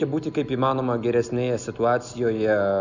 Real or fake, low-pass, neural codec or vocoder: real; 7.2 kHz; none